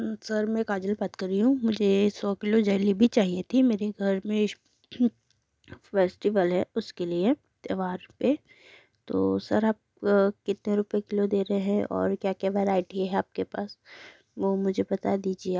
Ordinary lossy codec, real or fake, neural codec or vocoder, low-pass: none; real; none; none